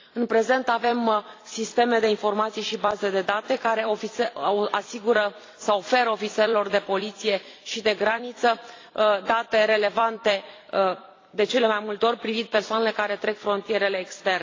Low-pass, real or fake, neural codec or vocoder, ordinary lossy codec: 7.2 kHz; fake; vocoder, 44.1 kHz, 128 mel bands every 256 samples, BigVGAN v2; AAC, 32 kbps